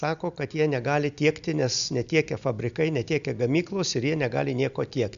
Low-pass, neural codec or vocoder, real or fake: 7.2 kHz; none; real